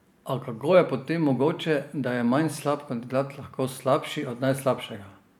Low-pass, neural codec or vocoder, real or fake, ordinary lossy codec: 19.8 kHz; vocoder, 44.1 kHz, 128 mel bands every 512 samples, BigVGAN v2; fake; none